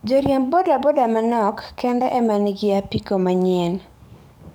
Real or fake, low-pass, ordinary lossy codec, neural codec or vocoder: fake; none; none; codec, 44.1 kHz, 7.8 kbps, DAC